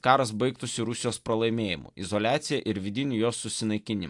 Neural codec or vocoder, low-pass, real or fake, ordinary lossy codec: none; 10.8 kHz; real; AAC, 64 kbps